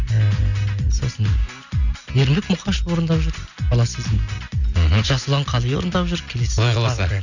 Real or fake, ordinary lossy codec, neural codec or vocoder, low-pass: real; none; none; 7.2 kHz